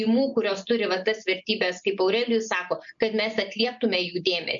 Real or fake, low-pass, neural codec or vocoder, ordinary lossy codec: real; 7.2 kHz; none; MP3, 96 kbps